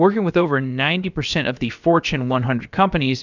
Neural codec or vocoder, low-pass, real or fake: codec, 16 kHz, about 1 kbps, DyCAST, with the encoder's durations; 7.2 kHz; fake